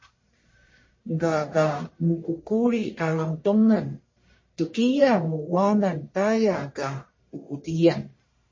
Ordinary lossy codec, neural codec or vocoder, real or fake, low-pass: MP3, 32 kbps; codec, 44.1 kHz, 1.7 kbps, Pupu-Codec; fake; 7.2 kHz